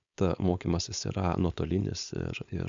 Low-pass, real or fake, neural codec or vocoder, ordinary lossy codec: 7.2 kHz; fake; codec, 16 kHz, 4.8 kbps, FACodec; MP3, 64 kbps